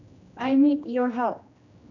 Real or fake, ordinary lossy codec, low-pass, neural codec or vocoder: fake; none; 7.2 kHz; codec, 16 kHz, 1 kbps, X-Codec, HuBERT features, trained on general audio